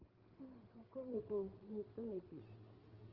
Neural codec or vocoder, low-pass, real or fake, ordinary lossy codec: codec, 16 kHz, 8 kbps, FreqCodec, larger model; 5.4 kHz; fake; Opus, 16 kbps